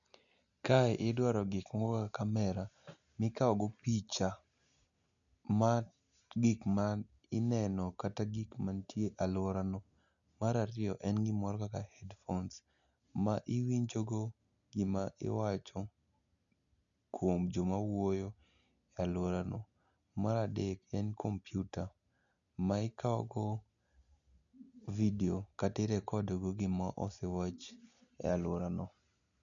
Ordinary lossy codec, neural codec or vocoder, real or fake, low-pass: none; none; real; 7.2 kHz